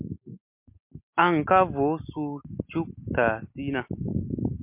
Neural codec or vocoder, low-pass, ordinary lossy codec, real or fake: none; 3.6 kHz; MP3, 32 kbps; real